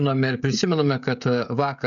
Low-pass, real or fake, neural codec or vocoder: 7.2 kHz; fake; codec, 16 kHz, 16 kbps, FreqCodec, smaller model